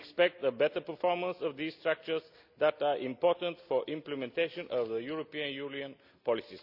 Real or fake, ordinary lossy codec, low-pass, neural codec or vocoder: real; none; 5.4 kHz; none